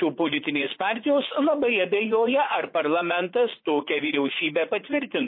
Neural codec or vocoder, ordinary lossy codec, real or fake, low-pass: vocoder, 44.1 kHz, 80 mel bands, Vocos; MP3, 24 kbps; fake; 5.4 kHz